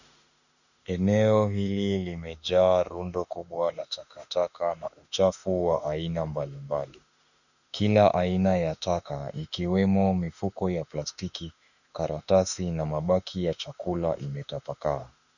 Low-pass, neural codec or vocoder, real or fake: 7.2 kHz; autoencoder, 48 kHz, 32 numbers a frame, DAC-VAE, trained on Japanese speech; fake